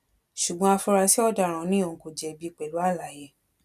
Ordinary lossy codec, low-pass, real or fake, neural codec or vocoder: none; 14.4 kHz; real; none